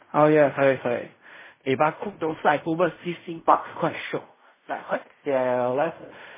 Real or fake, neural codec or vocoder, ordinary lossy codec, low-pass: fake; codec, 16 kHz in and 24 kHz out, 0.4 kbps, LongCat-Audio-Codec, fine tuned four codebook decoder; MP3, 16 kbps; 3.6 kHz